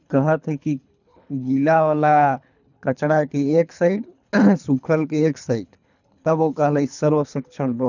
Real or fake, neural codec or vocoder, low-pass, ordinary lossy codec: fake; codec, 24 kHz, 3 kbps, HILCodec; 7.2 kHz; none